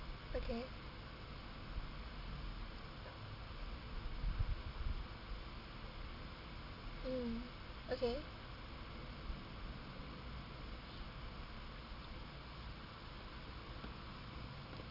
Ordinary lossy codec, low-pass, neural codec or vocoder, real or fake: none; 5.4 kHz; none; real